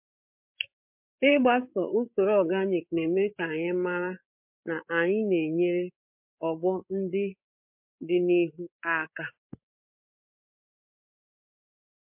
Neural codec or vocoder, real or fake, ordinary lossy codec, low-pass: codec, 16 kHz, 8 kbps, FreqCodec, larger model; fake; MP3, 32 kbps; 3.6 kHz